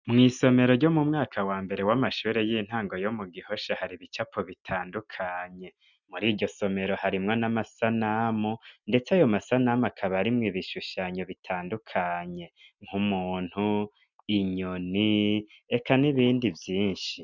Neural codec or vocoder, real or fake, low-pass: none; real; 7.2 kHz